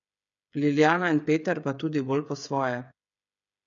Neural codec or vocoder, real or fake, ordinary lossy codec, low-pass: codec, 16 kHz, 8 kbps, FreqCodec, smaller model; fake; none; 7.2 kHz